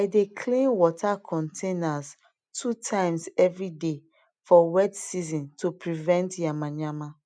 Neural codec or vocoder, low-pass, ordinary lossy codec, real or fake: none; 9.9 kHz; none; real